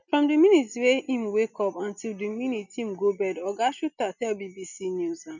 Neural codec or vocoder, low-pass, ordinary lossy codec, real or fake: none; 7.2 kHz; none; real